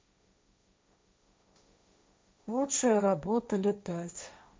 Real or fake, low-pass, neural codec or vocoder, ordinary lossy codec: fake; none; codec, 16 kHz, 1.1 kbps, Voila-Tokenizer; none